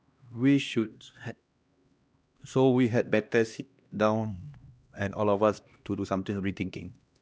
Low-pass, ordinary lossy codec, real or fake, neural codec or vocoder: none; none; fake; codec, 16 kHz, 1 kbps, X-Codec, HuBERT features, trained on LibriSpeech